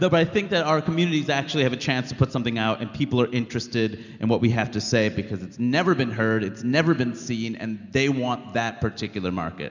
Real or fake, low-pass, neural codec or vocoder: real; 7.2 kHz; none